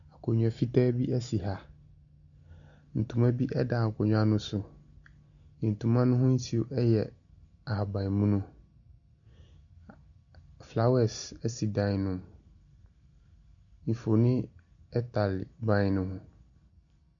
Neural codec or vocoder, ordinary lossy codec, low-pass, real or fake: none; MP3, 96 kbps; 7.2 kHz; real